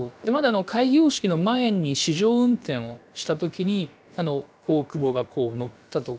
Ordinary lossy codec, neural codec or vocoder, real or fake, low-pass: none; codec, 16 kHz, about 1 kbps, DyCAST, with the encoder's durations; fake; none